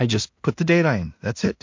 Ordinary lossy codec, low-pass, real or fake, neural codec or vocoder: MP3, 48 kbps; 7.2 kHz; fake; codec, 16 kHz in and 24 kHz out, 0.4 kbps, LongCat-Audio-Codec, two codebook decoder